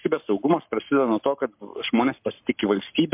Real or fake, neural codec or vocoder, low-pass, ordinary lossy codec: fake; codec, 44.1 kHz, 7.8 kbps, Pupu-Codec; 3.6 kHz; MP3, 32 kbps